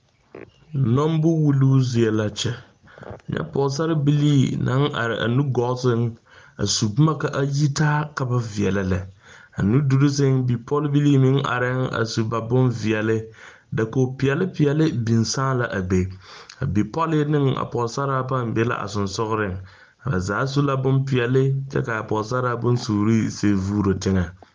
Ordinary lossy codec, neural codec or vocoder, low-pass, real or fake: Opus, 24 kbps; none; 7.2 kHz; real